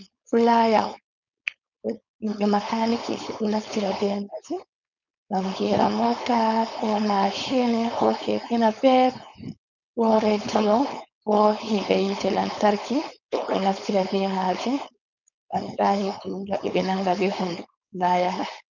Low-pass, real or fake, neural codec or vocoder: 7.2 kHz; fake; codec, 16 kHz, 4.8 kbps, FACodec